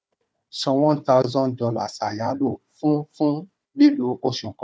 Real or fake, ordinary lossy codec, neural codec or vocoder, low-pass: fake; none; codec, 16 kHz, 4 kbps, FunCodec, trained on Chinese and English, 50 frames a second; none